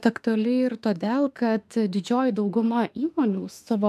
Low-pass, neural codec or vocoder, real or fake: 14.4 kHz; autoencoder, 48 kHz, 32 numbers a frame, DAC-VAE, trained on Japanese speech; fake